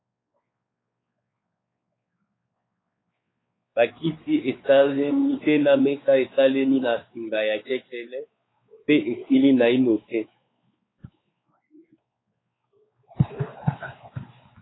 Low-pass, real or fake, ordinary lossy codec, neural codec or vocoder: 7.2 kHz; fake; AAC, 16 kbps; codec, 16 kHz, 4 kbps, X-Codec, WavLM features, trained on Multilingual LibriSpeech